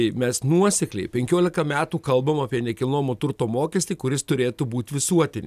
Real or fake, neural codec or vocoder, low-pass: real; none; 14.4 kHz